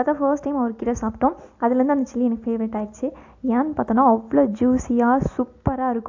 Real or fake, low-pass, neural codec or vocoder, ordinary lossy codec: real; 7.2 kHz; none; AAC, 48 kbps